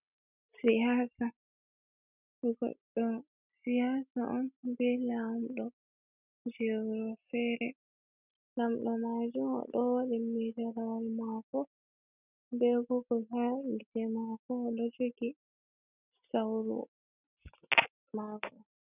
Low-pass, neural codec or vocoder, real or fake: 3.6 kHz; none; real